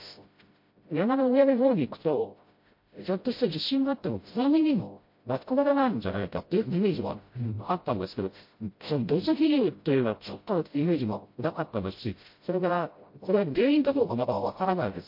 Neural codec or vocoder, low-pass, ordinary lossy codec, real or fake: codec, 16 kHz, 0.5 kbps, FreqCodec, smaller model; 5.4 kHz; MP3, 32 kbps; fake